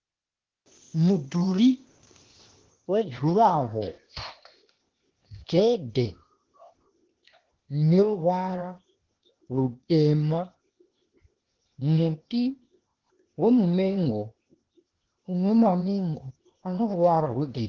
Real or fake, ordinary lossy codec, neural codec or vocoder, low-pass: fake; Opus, 16 kbps; codec, 16 kHz, 0.8 kbps, ZipCodec; 7.2 kHz